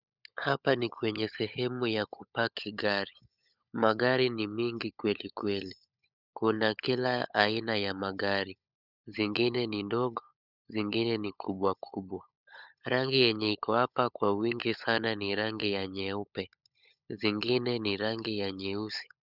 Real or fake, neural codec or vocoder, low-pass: fake; codec, 16 kHz, 16 kbps, FunCodec, trained on LibriTTS, 50 frames a second; 5.4 kHz